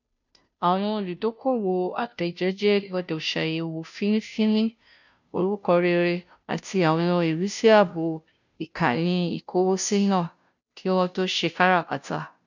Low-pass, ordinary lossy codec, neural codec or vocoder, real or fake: 7.2 kHz; none; codec, 16 kHz, 0.5 kbps, FunCodec, trained on Chinese and English, 25 frames a second; fake